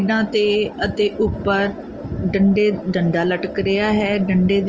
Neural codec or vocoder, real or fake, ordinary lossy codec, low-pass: none; real; Opus, 24 kbps; 7.2 kHz